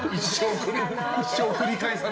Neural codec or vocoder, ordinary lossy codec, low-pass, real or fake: none; none; none; real